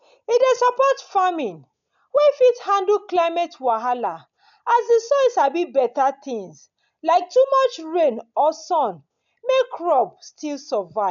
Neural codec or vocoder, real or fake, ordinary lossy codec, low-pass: none; real; none; 7.2 kHz